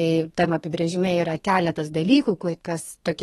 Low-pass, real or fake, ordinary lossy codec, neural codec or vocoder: 14.4 kHz; fake; AAC, 32 kbps; codec, 32 kHz, 1.9 kbps, SNAC